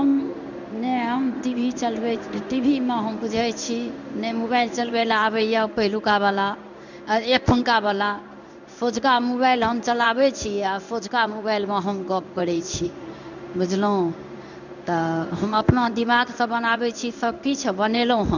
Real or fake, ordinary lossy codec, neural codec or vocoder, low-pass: fake; none; codec, 16 kHz in and 24 kHz out, 1 kbps, XY-Tokenizer; 7.2 kHz